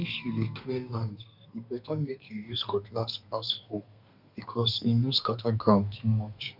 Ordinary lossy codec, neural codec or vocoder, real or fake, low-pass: none; codec, 32 kHz, 1.9 kbps, SNAC; fake; 5.4 kHz